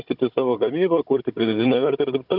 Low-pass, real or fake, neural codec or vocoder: 5.4 kHz; fake; codec, 16 kHz, 16 kbps, FunCodec, trained on Chinese and English, 50 frames a second